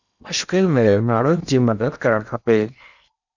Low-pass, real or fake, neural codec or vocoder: 7.2 kHz; fake; codec, 16 kHz in and 24 kHz out, 0.8 kbps, FocalCodec, streaming, 65536 codes